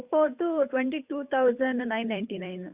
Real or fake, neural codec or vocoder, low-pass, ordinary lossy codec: fake; codec, 16 kHz, 8 kbps, FunCodec, trained on Chinese and English, 25 frames a second; 3.6 kHz; Opus, 64 kbps